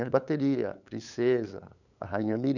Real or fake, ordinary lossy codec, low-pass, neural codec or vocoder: fake; none; 7.2 kHz; codec, 16 kHz, 8 kbps, FunCodec, trained on LibriTTS, 25 frames a second